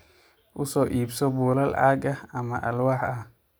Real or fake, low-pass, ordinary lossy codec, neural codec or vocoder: real; none; none; none